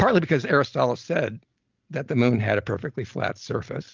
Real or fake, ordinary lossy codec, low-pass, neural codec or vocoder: real; Opus, 24 kbps; 7.2 kHz; none